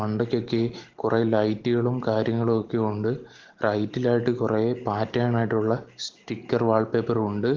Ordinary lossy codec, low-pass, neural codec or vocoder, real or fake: Opus, 16 kbps; 7.2 kHz; none; real